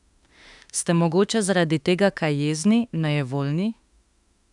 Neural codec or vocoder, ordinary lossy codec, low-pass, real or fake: autoencoder, 48 kHz, 32 numbers a frame, DAC-VAE, trained on Japanese speech; none; 10.8 kHz; fake